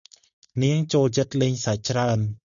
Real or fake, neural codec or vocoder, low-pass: real; none; 7.2 kHz